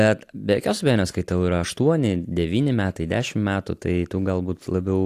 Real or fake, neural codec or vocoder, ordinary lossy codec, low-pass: real; none; AAC, 64 kbps; 14.4 kHz